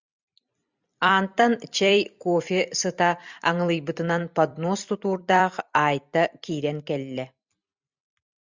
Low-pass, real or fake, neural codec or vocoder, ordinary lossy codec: 7.2 kHz; fake; vocoder, 44.1 kHz, 128 mel bands every 256 samples, BigVGAN v2; Opus, 64 kbps